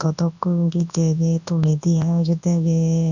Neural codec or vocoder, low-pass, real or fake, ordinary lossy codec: codec, 24 kHz, 0.9 kbps, WavTokenizer, large speech release; 7.2 kHz; fake; none